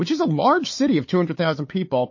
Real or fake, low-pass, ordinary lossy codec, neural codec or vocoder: fake; 7.2 kHz; MP3, 32 kbps; codec, 16 kHz, 4 kbps, FunCodec, trained on Chinese and English, 50 frames a second